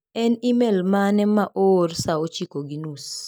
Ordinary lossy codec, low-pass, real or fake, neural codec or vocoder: none; none; real; none